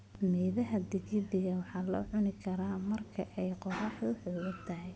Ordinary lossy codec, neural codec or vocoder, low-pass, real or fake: none; none; none; real